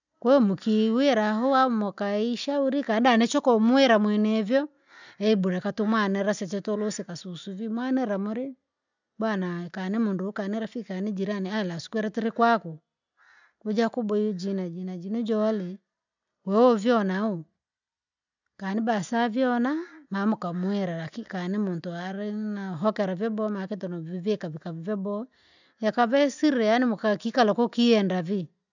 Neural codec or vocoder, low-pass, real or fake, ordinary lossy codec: none; 7.2 kHz; real; none